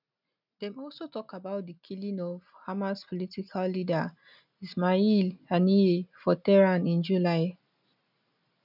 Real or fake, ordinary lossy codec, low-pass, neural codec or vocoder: real; none; 5.4 kHz; none